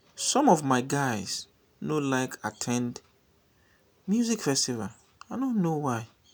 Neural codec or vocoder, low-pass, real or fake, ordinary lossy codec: none; none; real; none